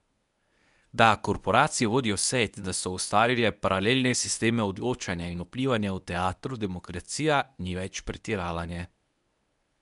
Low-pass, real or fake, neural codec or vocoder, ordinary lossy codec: 10.8 kHz; fake; codec, 24 kHz, 0.9 kbps, WavTokenizer, medium speech release version 1; none